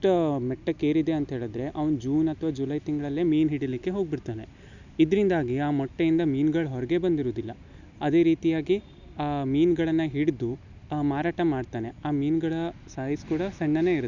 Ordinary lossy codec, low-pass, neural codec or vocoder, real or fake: none; 7.2 kHz; none; real